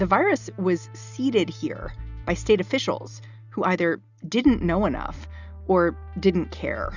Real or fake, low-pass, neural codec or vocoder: real; 7.2 kHz; none